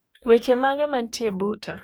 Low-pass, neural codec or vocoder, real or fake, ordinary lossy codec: none; codec, 44.1 kHz, 2.6 kbps, DAC; fake; none